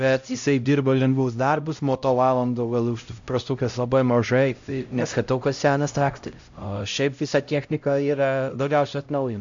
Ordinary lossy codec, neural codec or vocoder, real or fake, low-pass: AAC, 64 kbps; codec, 16 kHz, 0.5 kbps, X-Codec, HuBERT features, trained on LibriSpeech; fake; 7.2 kHz